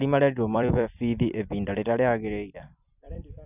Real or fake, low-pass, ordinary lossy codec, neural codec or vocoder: fake; 3.6 kHz; none; vocoder, 44.1 kHz, 128 mel bands every 256 samples, BigVGAN v2